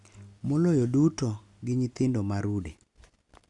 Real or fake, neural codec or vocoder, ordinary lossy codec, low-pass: real; none; none; 10.8 kHz